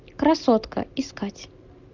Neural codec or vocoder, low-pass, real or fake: none; 7.2 kHz; real